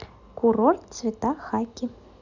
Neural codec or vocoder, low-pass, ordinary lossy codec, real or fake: none; 7.2 kHz; none; real